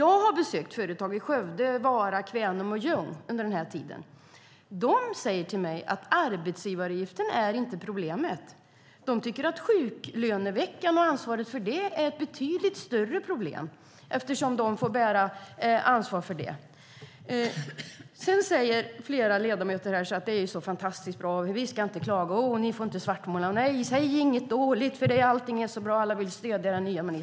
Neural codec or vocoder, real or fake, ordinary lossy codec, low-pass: none; real; none; none